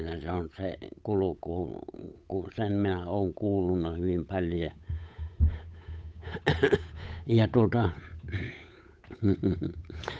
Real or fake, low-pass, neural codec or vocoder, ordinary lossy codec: fake; none; codec, 16 kHz, 8 kbps, FunCodec, trained on Chinese and English, 25 frames a second; none